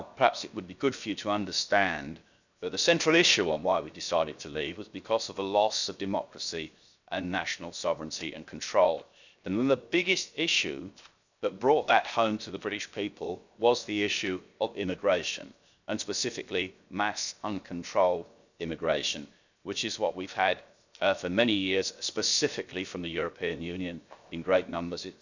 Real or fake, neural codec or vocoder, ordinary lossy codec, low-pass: fake; codec, 16 kHz, 0.7 kbps, FocalCodec; none; 7.2 kHz